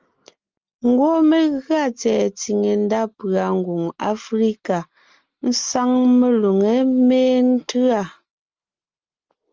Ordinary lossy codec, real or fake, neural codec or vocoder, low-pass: Opus, 24 kbps; real; none; 7.2 kHz